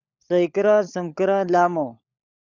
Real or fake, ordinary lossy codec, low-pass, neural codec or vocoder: fake; Opus, 64 kbps; 7.2 kHz; codec, 16 kHz, 16 kbps, FunCodec, trained on LibriTTS, 50 frames a second